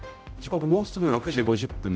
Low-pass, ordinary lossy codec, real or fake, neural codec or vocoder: none; none; fake; codec, 16 kHz, 0.5 kbps, X-Codec, HuBERT features, trained on general audio